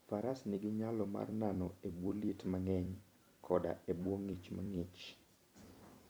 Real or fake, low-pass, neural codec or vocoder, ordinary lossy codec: fake; none; vocoder, 44.1 kHz, 128 mel bands every 256 samples, BigVGAN v2; none